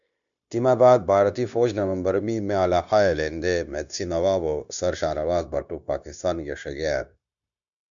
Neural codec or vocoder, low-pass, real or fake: codec, 16 kHz, 0.9 kbps, LongCat-Audio-Codec; 7.2 kHz; fake